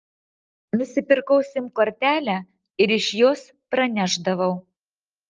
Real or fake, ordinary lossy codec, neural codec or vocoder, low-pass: real; Opus, 24 kbps; none; 7.2 kHz